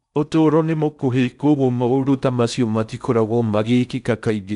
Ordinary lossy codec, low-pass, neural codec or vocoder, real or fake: none; 10.8 kHz; codec, 16 kHz in and 24 kHz out, 0.6 kbps, FocalCodec, streaming, 4096 codes; fake